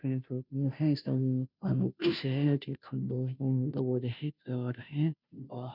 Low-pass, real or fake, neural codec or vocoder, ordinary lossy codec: 5.4 kHz; fake; codec, 16 kHz, 0.5 kbps, FunCodec, trained on Chinese and English, 25 frames a second; none